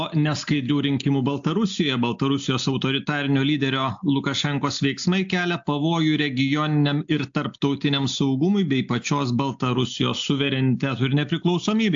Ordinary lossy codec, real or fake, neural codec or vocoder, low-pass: AAC, 64 kbps; real; none; 7.2 kHz